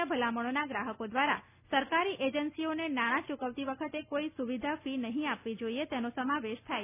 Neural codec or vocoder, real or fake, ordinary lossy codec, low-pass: none; real; none; 3.6 kHz